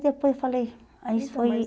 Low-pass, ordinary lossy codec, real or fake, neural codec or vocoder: none; none; real; none